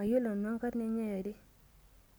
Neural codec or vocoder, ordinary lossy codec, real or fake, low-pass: none; none; real; none